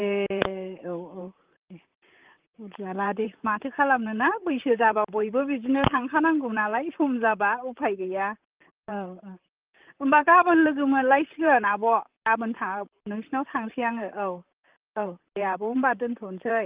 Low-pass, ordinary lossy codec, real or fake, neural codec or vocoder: 3.6 kHz; Opus, 32 kbps; fake; vocoder, 44.1 kHz, 128 mel bands, Pupu-Vocoder